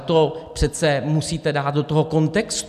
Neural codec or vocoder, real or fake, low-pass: none; real; 14.4 kHz